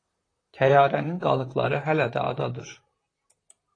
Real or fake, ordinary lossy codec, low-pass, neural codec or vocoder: fake; AAC, 32 kbps; 9.9 kHz; vocoder, 44.1 kHz, 128 mel bands, Pupu-Vocoder